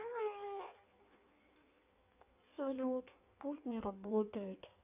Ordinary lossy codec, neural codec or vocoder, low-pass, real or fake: none; codec, 16 kHz in and 24 kHz out, 1.1 kbps, FireRedTTS-2 codec; 3.6 kHz; fake